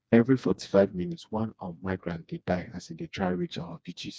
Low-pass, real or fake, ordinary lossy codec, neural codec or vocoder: none; fake; none; codec, 16 kHz, 2 kbps, FreqCodec, smaller model